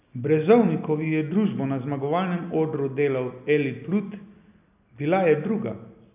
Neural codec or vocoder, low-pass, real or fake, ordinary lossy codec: none; 3.6 kHz; real; none